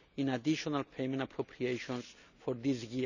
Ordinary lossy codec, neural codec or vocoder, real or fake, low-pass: none; none; real; 7.2 kHz